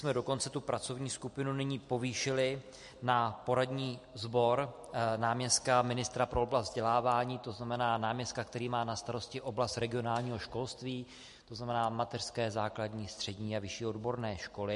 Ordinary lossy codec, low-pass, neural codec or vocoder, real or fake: MP3, 48 kbps; 14.4 kHz; none; real